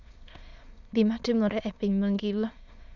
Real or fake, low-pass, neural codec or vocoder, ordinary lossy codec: fake; 7.2 kHz; autoencoder, 22.05 kHz, a latent of 192 numbers a frame, VITS, trained on many speakers; none